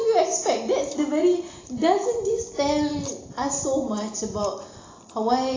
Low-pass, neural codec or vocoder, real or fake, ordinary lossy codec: 7.2 kHz; none; real; AAC, 32 kbps